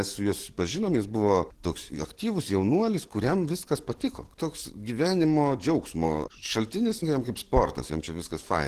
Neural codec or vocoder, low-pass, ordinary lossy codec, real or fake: codec, 44.1 kHz, 7.8 kbps, DAC; 14.4 kHz; Opus, 16 kbps; fake